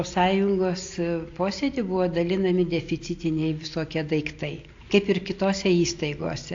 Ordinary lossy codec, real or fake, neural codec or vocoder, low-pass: AAC, 48 kbps; real; none; 7.2 kHz